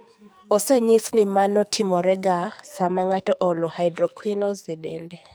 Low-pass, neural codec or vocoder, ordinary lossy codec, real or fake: none; codec, 44.1 kHz, 2.6 kbps, SNAC; none; fake